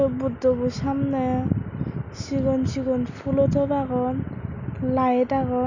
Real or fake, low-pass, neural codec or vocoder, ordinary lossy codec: real; 7.2 kHz; none; none